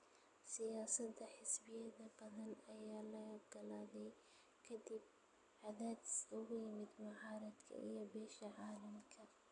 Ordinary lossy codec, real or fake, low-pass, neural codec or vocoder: none; real; 10.8 kHz; none